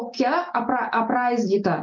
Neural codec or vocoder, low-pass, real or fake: none; 7.2 kHz; real